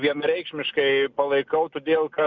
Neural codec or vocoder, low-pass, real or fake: none; 7.2 kHz; real